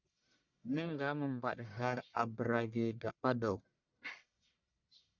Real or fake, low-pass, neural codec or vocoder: fake; 7.2 kHz; codec, 44.1 kHz, 1.7 kbps, Pupu-Codec